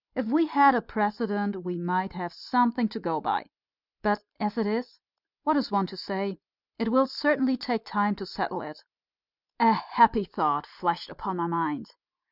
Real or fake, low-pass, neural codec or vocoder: real; 5.4 kHz; none